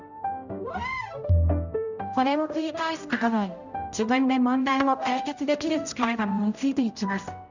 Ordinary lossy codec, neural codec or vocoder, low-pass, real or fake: none; codec, 16 kHz, 0.5 kbps, X-Codec, HuBERT features, trained on general audio; 7.2 kHz; fake